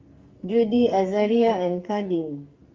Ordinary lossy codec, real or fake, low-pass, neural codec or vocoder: Opus, 32 kbps; fake; 7.2 kHz; codec, 44.1 kHz, 2.6 kbps, SNAC